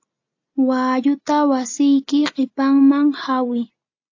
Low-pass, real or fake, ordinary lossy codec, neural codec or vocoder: 7.2 kHz; real; AAC, 48 kbps; none